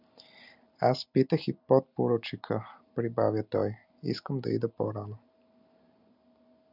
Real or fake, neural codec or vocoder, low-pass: real; none; 5.4 kHz